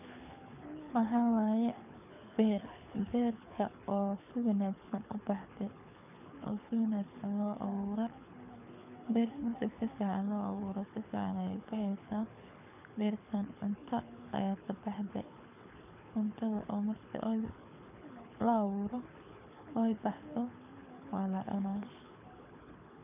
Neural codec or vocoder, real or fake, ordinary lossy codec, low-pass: codec, 24 kHz, 6 kbps, HILCodec; fake; none; 3.6 kHz